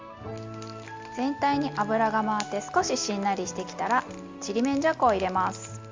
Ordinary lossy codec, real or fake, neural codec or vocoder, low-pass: Opus, 32 kbps; real; none; 7.2 kHz